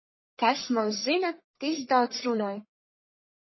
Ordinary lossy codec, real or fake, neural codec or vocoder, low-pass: MP3, 24 kbps; fake; codec, 44.1 kHz, 1.7 kbps, Pupu-Codec; 7.2 kHz